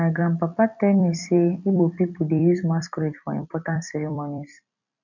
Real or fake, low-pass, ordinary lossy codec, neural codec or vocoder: fake; 7.2 kHz; none; vocoder, 44.1 kHz, 128 mel bands every 256 samples, BigVGAN v2